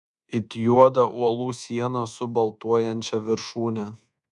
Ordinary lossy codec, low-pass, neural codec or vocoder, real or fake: MP3, 96 kbps; 10.8 kHz; codec, 24 kHz, 1.2 kbps, DualCodec; fake